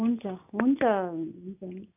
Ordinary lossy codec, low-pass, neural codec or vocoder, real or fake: none; 3.6 kHz; none; real